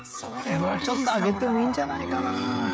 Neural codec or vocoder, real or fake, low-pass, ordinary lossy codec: codec, 16 kHz, 16 kbps, FreqCodec, smaller model; fake; none; none